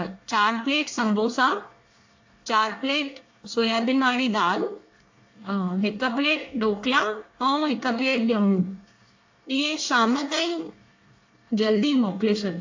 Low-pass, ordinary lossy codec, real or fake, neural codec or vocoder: 7.2 kHz; AAC, 48 kbps; fake; codec, 24 kHz, 1 kbps, SNAC